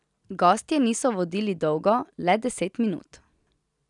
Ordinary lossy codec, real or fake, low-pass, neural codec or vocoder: none; real; 10.8 kHz; none